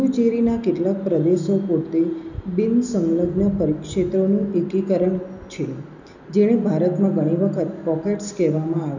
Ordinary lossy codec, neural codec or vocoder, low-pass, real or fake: none; none; 7.2 kHz; real